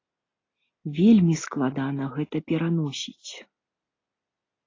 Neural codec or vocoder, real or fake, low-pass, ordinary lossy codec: none; real; 7.2 kHz; AAC, 32 kbps